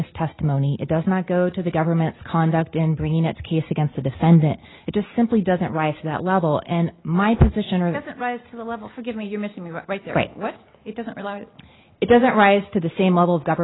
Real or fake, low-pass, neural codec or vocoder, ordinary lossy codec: real; 7.2 kHz; none; AAC, 16 kbps